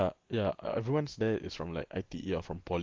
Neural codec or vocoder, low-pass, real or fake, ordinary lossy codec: none; 7.2 kHz; real; Opus, 16 kbps